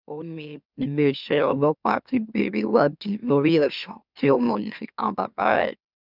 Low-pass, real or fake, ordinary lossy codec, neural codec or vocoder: 5.4 kHz; fake; none; autoencoder, 44.1 kHz, a latent of 192 numbers a frame, MeloTTS